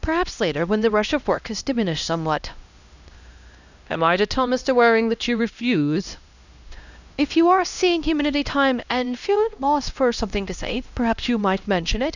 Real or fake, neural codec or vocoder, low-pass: fake; codec, 16 kHz, 1 kbps, X-Codec, HuBERT features, trained on LibriSpeech; 7.2 kHz